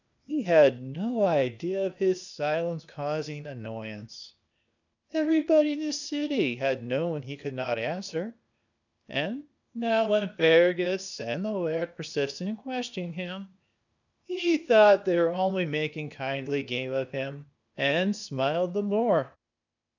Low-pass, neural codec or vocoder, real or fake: 7.2 kHz; codec, 16 kHz, 0.8 kbps, ZipCodec; fake